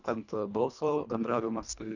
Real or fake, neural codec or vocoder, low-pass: fake; codec, 24 kHz, 1.5 kbps, HILCodec; 7.2 kHz